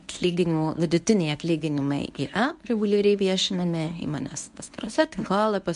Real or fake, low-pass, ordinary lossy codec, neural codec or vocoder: fake; 10.8 kHz; MP3, 64 kbps; codec, 24 kHz, 0.9 kbps, WavTokenizer, medium speech release version 1